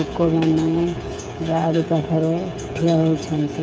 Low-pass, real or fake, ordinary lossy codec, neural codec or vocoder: none; fake; none; codec, 16 kHz, 8 kbps, FreqCodec, smaller model